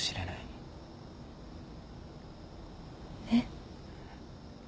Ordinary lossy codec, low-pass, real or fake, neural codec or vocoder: none; none; real; none